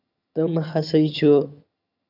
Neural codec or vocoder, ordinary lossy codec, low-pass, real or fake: vocoder, 22.05 kHz, 80 mel bands, HiFi-GAN; none; 5.4 kHz; fake